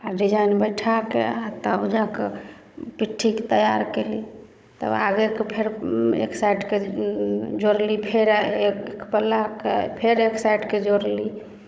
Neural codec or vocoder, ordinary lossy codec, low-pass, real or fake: codec, 16 kHz, 16 kbps, FunCodec, trained on Chinese and English, 50 frames a second; none; none; fake